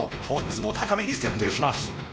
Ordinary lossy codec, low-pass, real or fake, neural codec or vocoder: none; none; fake; codec, 16 kHz, 1 kbps, X-Codec, WavLM features, trained on Multilingual LibriSpeech